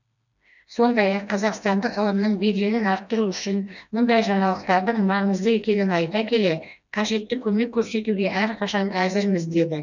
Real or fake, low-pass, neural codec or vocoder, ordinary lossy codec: fake; 7.2 kHz; codec, 16 kHz, 1 kbps, FreqCodec, smaller model; none